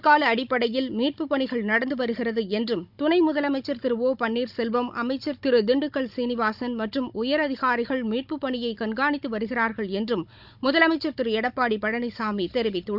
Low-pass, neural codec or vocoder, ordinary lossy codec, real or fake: 5.4 kHz; codec, 16 kHz, 16 kbps, FunCodec, trained on Chinese and English, 50 frames a second; none; fake